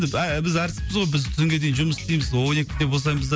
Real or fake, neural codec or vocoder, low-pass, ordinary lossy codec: real; none; none; none